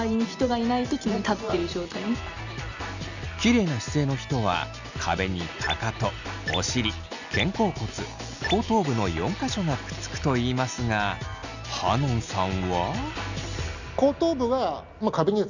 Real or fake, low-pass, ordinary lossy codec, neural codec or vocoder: real; 7.2 kHz; none; none